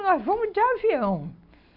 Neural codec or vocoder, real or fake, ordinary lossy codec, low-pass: none; real; none; 5.4 kHz